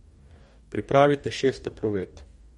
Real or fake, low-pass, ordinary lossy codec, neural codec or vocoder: fake; 19.8 kHz; MP3, 48 kbps; codec, 44.1 kHz, 2.6 kbps, DAC